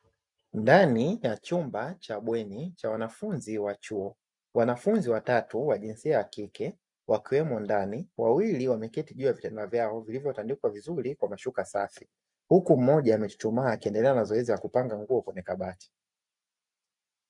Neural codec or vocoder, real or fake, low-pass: none; real; 10.8 kHz